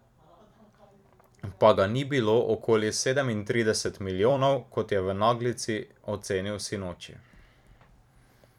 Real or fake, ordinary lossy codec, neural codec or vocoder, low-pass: fake; none; vocoder, 44.1 kHz, 128 mel bands every 256 samples, BigVGAN v2; 19.8 kHz